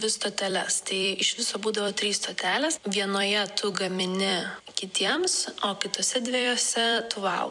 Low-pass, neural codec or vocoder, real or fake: 10.8 kHz; vocoder, 44.1 kHz, 128 mel bands every 256 samples, BigVGAN v2; fake